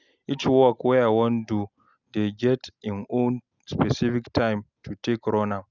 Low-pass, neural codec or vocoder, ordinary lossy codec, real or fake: 7.2 kHz; none; none; real